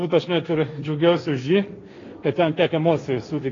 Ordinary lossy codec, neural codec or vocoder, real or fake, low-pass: AAC, 32 kbps; codec, 16 kHz, 1.1 kbps, Voila-Tokenizer; fake; 7.2 kHz